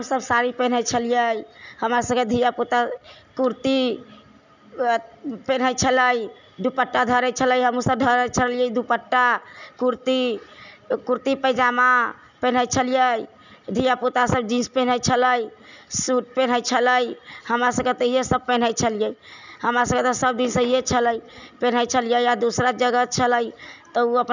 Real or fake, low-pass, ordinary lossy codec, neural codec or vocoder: real; 7.2 kHz; none; none